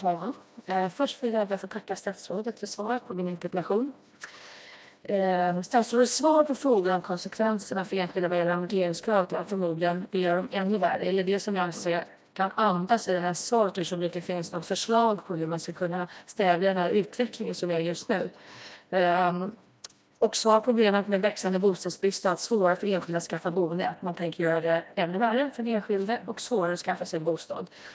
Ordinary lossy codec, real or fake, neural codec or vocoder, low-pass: none; fake; codec, 16 kHz, 1 kbps, FreqCodec, smaller model; none